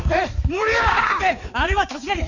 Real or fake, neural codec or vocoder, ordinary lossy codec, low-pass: fake; codec, 16 kHz, 4 kbps, X-Codec, HuBERT features, trained on balanced general audio; none; 7.2 kHz